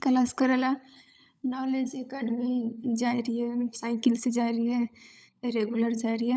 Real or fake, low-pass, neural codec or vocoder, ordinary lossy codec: fake; none; codec, 16 kHz, 16 kbps, FunCodec, trained on LibriTTS, 50 frames a second; none